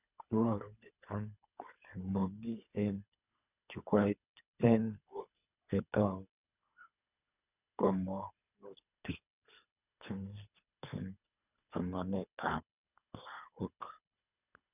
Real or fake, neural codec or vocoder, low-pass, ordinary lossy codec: fake; codec, 24 kHz, 3 kbps, HILCodec; 3.6 kHz; none